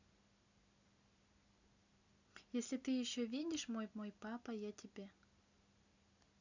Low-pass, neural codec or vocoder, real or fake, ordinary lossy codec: 7.2 kHz; none; real; none